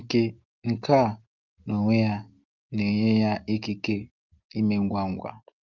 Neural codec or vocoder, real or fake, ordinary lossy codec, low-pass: none; real; Opus, 32 kbps; 7.2 kHz